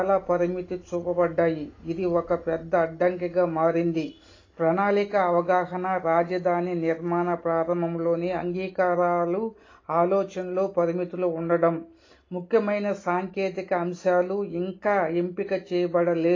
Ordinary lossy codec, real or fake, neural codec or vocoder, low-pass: AAC, 32 kbps; real; none; 7.2 kHz